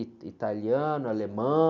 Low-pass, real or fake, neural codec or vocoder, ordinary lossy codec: 7.2 kHz; real; none; none